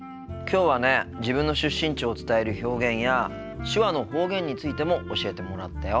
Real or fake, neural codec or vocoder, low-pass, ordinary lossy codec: real; none; none; none